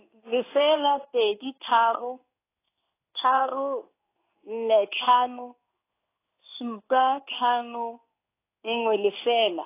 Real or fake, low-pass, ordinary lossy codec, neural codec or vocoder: fake; 3.6 kHz; AAC, 24 kbps; codec, 16 kHz in and 24 kHz out, 1 kbps, XY-Tokenizer